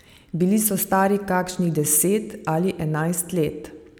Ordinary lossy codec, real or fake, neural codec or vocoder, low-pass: none; real; none; none